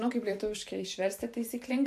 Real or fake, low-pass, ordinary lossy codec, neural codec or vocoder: fake; 14.4 kHz; MP3, 64 kbps; vocoder, 44.1 kHz, 128 mel bands every 512 samples, BigVGAN v2